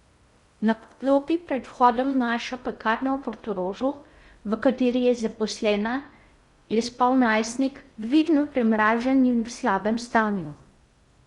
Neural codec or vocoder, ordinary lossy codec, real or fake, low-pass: codec, 16 kHz in and 24 kHz out, 0.6 kbps, FocalCodec, streaming, 2048 codes; Opus, 64 kbps; fake; 10.8 kHz